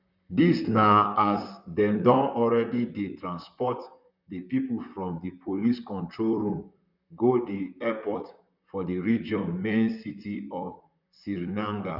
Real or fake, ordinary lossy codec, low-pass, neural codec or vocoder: fake; none; 5.4 kHz; vocoder, 44.1 kHz, 128 mel bands, Pupu-Vocoder